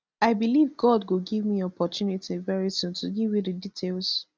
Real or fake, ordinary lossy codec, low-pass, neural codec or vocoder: real; none; none; none